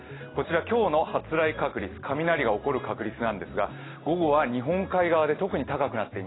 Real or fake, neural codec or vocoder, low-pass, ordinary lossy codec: real; none; 7.2 kHz; AAC, 16 kbps